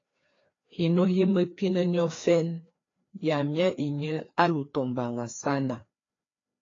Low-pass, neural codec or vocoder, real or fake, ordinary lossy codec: 7.2 kHz; codec, 16 kHz, 2 kbps, FreqCodec, larger model; fake; AAC, 32 kbps